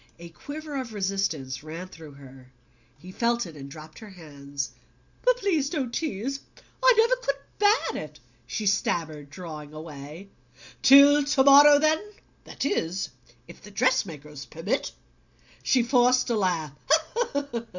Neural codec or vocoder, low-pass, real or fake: none; 7.2 kHz; real